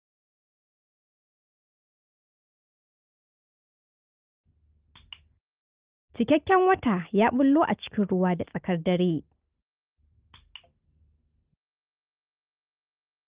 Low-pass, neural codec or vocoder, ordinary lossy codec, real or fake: 3.6 kHz; none; Opus, 32 kbps; real